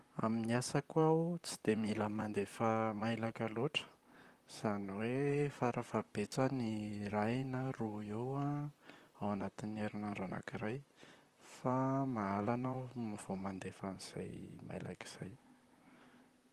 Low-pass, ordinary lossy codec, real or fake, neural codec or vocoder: 14.4 kHz; Opus, 24 kbps; fake; vocoder, 44.1 kHz, 128 mel bands, Pupu-Vocoder